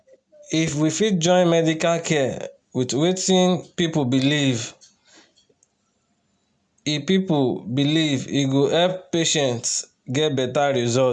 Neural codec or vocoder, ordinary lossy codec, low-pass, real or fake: none; none; 9.9 kHz; real